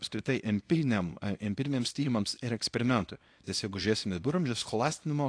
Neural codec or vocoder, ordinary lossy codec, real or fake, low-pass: codec, 24 kHz, 0.9 kbps, WavTokenizer, small release; AAC, 48 kbps; fake; 9.9 kHz